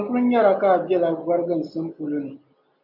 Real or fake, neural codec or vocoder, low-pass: real; none; 5.4 kHz